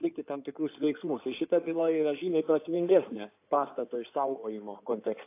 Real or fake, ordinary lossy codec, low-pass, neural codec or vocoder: fake; AAC, 24 kbps; 3.6 kHz; codec, 16 kHz in and 24 kHz out, 2.2 kbps, FireRedTTS-2 codec